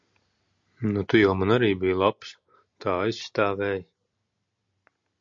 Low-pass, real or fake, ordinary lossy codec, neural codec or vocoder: 7.2 kHz; real; MP3, 48 kbps; none